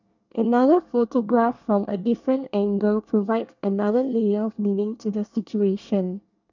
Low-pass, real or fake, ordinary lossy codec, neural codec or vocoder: 7.2 kHz; fake; none; codec, 24 kHz, 1 kbps, SNAC